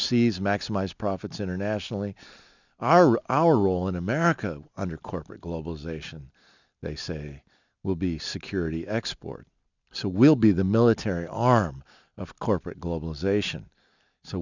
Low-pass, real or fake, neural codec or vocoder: 7.2 kHz; real; none